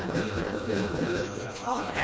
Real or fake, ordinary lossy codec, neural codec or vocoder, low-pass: fake; none; codec, 16 kHz, 1 kbps, FreqCodec, smaller model; none